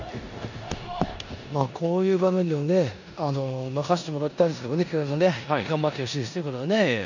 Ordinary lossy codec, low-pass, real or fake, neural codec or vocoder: none; 7.2 kHz; fake; codec, 16 kHz in and 24 kHz out, 0.9 kbps, LongCat-Audio-Codec, four codebook decoder